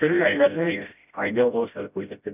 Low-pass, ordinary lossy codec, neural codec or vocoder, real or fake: 3.6 kHz; none; codec, 16 kHz, 0.5 kbps, FreqCodec, smaller model; fake